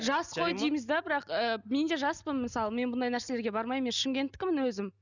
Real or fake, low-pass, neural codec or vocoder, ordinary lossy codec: real; 7.2 kHz; none; none